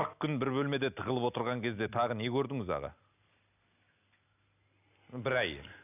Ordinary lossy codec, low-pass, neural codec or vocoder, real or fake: none; 3.6 kHz; none; real